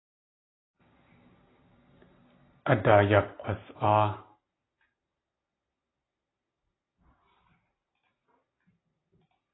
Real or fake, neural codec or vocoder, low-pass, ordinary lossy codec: real; none; 7.2 kHz; AAC, 16 kbps